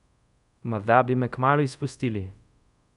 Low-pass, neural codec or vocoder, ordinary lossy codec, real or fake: 10.8 kHz; codec, 24 kHz, 0.5 kbps, DualCodec; none; fake